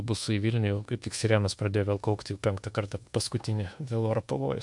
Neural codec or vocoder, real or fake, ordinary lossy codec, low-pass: codec, 24 kHz, 1.2 kbps, DualCodec; fake; MP3, 64 kbps; 10.8 kHz